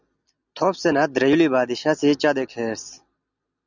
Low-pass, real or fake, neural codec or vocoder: 7.2 kHz; real; none